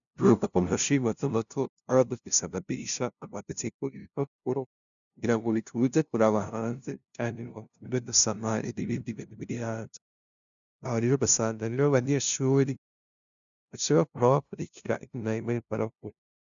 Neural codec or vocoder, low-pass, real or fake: codec, 16 kHz, 0.5 kbps, FunCodec, trained on LibriTTS, 25 frames a second; 7.2 kHz; fake